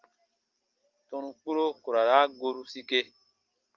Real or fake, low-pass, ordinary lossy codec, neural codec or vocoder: real; 7.2 kHz; Opus, 24 kbps; none